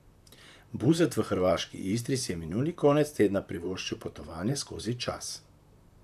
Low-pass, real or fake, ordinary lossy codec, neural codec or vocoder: 14.4 kHz; fake; AAC, 96 kbps; vocoder, 44.1 kHz, 128 mel bands, Pupu-Vocoder